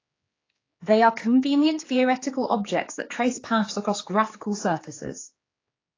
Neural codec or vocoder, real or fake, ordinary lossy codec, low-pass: codec, 16 kHz, 4 kbps, X-Codec, HuBERT features, trained on general audio; fake; AAC, 32 kbps; 7.2 kHz